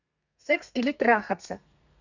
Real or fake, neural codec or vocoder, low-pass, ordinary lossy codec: fake; codec, 32 kHz, 1.9 kbps, SNAC; 7.2 kHz; none